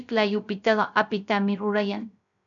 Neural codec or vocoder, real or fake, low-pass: codec, 16 kHz, 0.3 kbps, FocalCodec; fake; 7.2 kHz